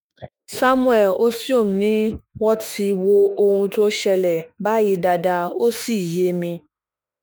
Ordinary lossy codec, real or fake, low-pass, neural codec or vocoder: none; fake; none; autoencoder, 48 kHz, 32 numbers a frame, DAC-VAE, trained on Japanese speech